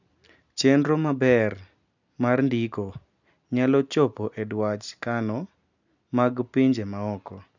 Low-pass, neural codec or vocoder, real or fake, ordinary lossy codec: 7.2 kHz; none; real; none